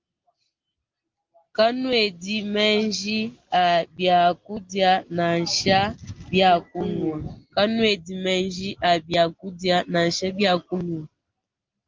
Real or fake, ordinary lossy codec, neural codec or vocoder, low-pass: real; Opus, 32 kbps; none; 7.2 kHz